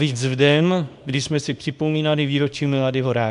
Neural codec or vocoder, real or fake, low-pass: codec, 24 kHz, 0.9 kbps, WavTokenizer, small release; fake; 10.8 kHz